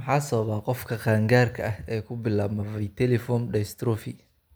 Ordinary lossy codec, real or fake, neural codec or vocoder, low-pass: none; real; none; none